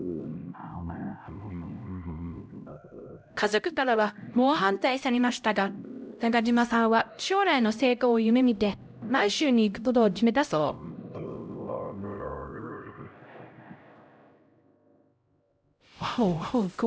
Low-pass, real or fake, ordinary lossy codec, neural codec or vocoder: none; fake; none; codec, 16 kHz, 0.5 kbps, X-Codec, HuBERT features, trained on LibriSpeech